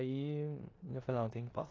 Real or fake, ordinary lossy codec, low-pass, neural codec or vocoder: fake; AAC, 32 kbps; 7.2 kHz; codec, 16 kHz in and 24 kHz out, 0.9 kbps, LongCat-Audio-Codec, four codebook decoder